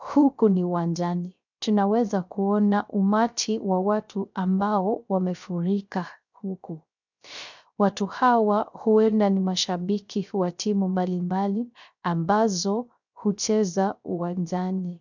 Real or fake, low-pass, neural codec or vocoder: fake; 7.2 kHz; codec, 16 kHz, 0.3 kbps, FocalCodec